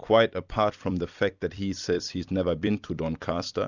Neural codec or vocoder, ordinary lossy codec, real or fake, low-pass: vocoder, 44.1 kHz, 128 mel bands every 256 samples, BigVGAN v2; Opus, 64 kbps; fake; 7.2 kHz